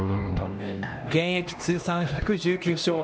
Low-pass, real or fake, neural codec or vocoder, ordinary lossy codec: none; fake; codec, 16 kHz, 1 kbps, X-Codec, HuBERT features, trained on LibriSpeech; none